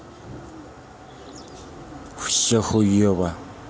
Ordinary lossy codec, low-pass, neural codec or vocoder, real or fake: none; none; none; real